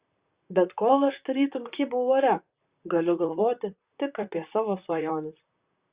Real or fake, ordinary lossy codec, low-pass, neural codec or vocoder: fake; Opus, 64 kbps; 3.6 kHz; vocoder, 44.1 kHz, 128 mel bands, Pupu-Vocoder